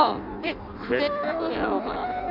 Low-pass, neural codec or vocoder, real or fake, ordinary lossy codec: 5.4 kHz; codec, 16 kHz in and 24 kHz out, 0.6 kbps, FireRedTTS-2 codec; fake; none